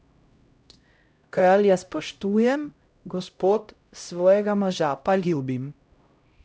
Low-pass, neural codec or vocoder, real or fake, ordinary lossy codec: none; codec, 16 kHz, 0.5 kbps, X-Codec, HuBERT features, trained on LibriSpeech; fake; none